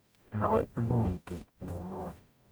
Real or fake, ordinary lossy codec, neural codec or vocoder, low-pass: fake; none; codec, 44.1 kHz, 0.9 kbps, DAC; none